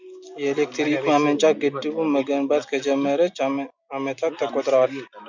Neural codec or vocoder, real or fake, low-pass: none; real; 7.2 kHz